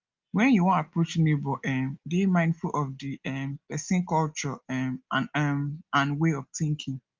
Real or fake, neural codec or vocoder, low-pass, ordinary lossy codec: real; none; 7.2 kHz; Opus, 24 kbps